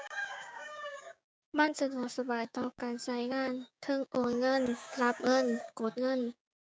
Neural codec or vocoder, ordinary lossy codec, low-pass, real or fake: codec, 16 kHz, 6 kbps, DAC; none; none; fake